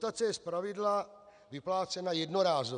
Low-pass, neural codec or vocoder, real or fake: 9.9 kHz; none; real